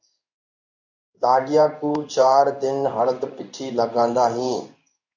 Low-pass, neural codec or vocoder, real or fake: 7.2 kHz; codec, 16 kHz in and 24 kHz out, 1 kbps, XY-Tokenizer; fake